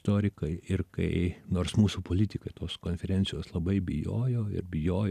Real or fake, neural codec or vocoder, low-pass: real; none; 14.4 kHz